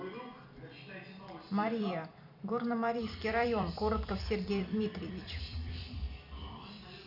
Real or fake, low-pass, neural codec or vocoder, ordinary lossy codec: fake; 5.4 kHz; vocoder, 44.1 kHz, 128 mel bands every 256 samples, BigVGAN v2; none